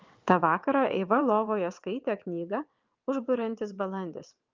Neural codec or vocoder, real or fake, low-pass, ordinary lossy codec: vocoder, 22.05 kHz, 80 mel bands, WaveNeXt; fake; 7.2 kHz; Opus, 32 kbps